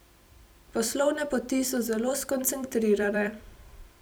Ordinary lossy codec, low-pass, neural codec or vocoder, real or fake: none; none; vocoder, 44.1 kHz, 128 mel bands every 256 samples, BigVGAN v2; fake